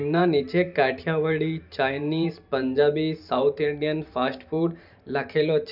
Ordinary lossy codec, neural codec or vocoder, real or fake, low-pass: none; vocoder, 44.1 kHz, 128 mel bands every 512 samples, BigVGAN v2; fake; 5.4 kHz